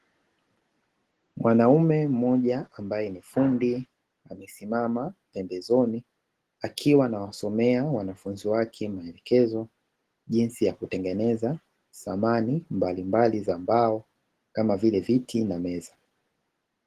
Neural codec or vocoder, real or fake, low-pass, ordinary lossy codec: none; real; 14.4 kHz; Opus, 16 kbps